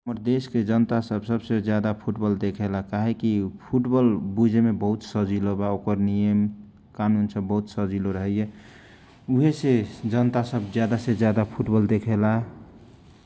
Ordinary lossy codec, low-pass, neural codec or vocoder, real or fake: none; none; none; real